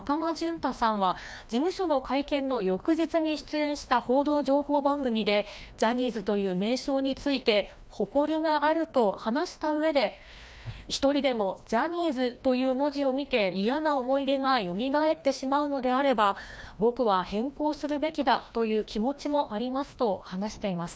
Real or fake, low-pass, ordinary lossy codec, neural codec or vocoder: fake; none; none; codec, 16 kHz, 1 kbps, FreqCodec, larger model